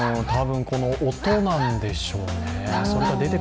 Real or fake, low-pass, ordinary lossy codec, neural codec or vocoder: real; none; none; none